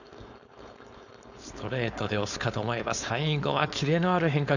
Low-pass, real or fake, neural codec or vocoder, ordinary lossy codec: 7.2 kHz; fake; codec, 16 kHz, 4.8 kbps, FACodec; none